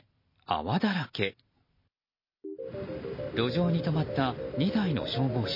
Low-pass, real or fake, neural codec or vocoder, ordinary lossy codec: 5.4 kHz; real; none; MP3, 24 kbps